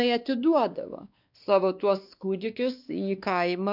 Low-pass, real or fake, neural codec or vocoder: 5.4 kHz; fake; codec, 16 kHz, 2 kbps, X-Codec, WavLM features, trained on Multilingual LibriSpeech